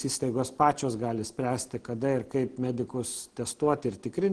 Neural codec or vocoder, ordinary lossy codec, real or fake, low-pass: none; Opus, 16 kbps; real; 9.9 kHz